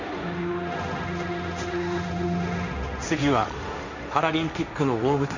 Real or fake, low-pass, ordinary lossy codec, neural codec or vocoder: fake; 7.2 kHz; none; codec, 16 kHz, 1.1 kbps, Voila-Tokenizer